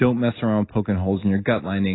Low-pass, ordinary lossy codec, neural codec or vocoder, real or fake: 7.2 kHz; AAC, 16 kbps; none; real